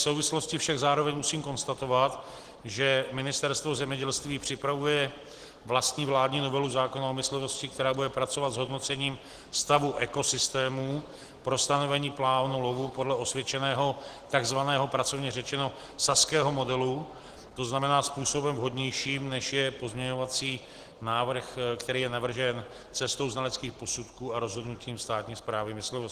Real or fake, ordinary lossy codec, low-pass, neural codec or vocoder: real; Opus, 16 kbps; 14.4 kHz; none